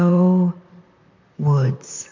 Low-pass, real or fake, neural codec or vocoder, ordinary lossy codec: 7.2 kHz; fake; vocoder, 44.1 kHz, 128 mel bands, Pupu-Vocoder; MP3, 64 kbps